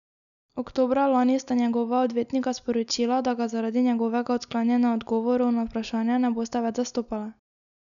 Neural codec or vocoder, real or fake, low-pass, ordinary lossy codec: none; real; 7.2 kHz; none